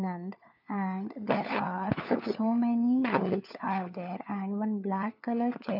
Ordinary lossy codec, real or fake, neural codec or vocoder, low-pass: none; fake; codec, 16 kHz, 4 kbps, FunCodec, trained on LibriTTS, 50 frames a second; 5.4 kHz